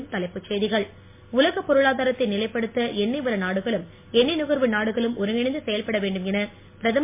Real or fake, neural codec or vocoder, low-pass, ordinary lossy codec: real; none; 3.6 kHz; MP3, 24 kbps